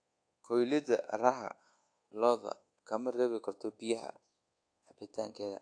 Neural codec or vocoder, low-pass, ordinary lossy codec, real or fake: codec, 24 kHz, 1.2 kbps, DualCodec; 9.9 kHz; AAC, 48 kbps; fake